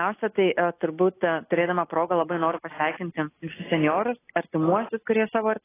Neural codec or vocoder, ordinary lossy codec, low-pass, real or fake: none; AAC, 16 kbps; 3.6 kHz; real